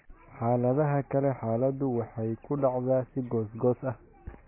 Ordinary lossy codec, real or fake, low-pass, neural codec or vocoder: MP3, 16 kbps; real; 3.6 kHz; none